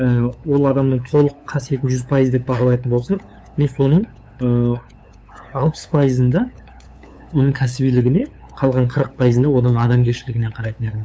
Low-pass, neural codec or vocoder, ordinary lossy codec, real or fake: none; codec, 16 kHz, 8 kbps, FunCodec, trained on LibriTTS, 25 frames a second; none; fake